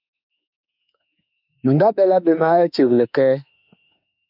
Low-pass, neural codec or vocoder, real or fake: 5.4 kHz; autoencoder, 48 kHz, 32 numbers a frame, DAC-VAE, trained on Japanese speech; fake